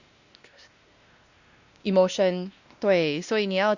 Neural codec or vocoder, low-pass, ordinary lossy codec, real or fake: codec, 16 kHz, 1 kbps, X-Codec, WavLM features, trained on Multilingual LibriSpeech; 7.2 kHz; Opus, 64 kbps; fake